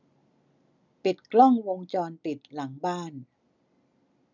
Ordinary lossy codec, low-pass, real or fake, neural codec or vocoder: none; 7.2 kHz; real; none